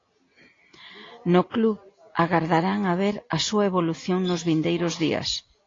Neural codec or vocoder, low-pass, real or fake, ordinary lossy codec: none; 7.2 kHz; real; AAC, 32 kbps